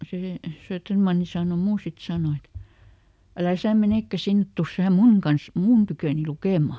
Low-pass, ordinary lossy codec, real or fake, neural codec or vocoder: none; none; real; none